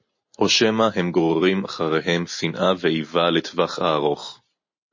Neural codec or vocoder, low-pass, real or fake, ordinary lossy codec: none; 7.2 kHz; real; MP3, 32 kbps